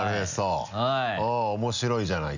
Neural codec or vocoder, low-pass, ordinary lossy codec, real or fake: none; 7.2 kHz; none; real